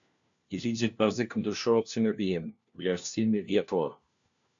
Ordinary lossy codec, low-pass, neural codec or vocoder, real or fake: AAC, 64 kbps; 7.2 kHz; codec, 16 kHz, 1 kbps, FunCodec, trained on LibriTTS, 50 frames a second; fake